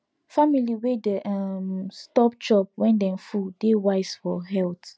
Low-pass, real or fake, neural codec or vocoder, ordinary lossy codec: none; real; none; none